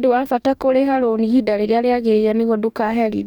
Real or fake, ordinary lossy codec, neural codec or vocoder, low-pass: fake; none; codec, 44.1 kHz, 2.6 kbps, DAC; 19.8 kHz